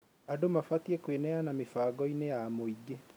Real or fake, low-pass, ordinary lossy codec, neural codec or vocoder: real; none; none; none